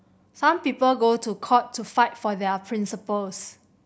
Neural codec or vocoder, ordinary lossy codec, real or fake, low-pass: none; none; real; none